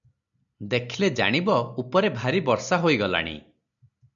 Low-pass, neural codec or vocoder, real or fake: 7.2 kHz; none; real